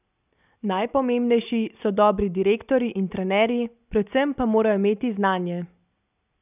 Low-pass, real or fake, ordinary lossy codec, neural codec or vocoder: 3.6 kHz; real; none; none